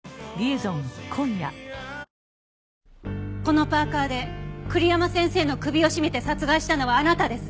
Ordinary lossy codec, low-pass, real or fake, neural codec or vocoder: none; none; real; none